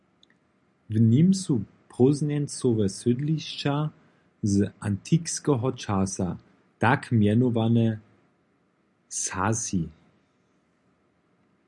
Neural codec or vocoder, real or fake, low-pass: none; real; 10.8 kHz